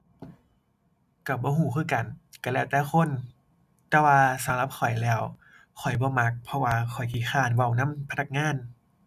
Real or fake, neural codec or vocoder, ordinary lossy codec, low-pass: real; none; none; 14.4 kHz